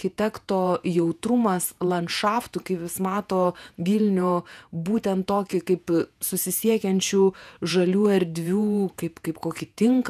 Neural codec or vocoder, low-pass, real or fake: vocoder, 48 kHz, 128 mel bands, Vocos; 14.4 kHz; fake